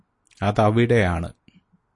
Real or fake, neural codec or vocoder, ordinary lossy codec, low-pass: real; none; MP3, 48 kbps; 10.8 kHz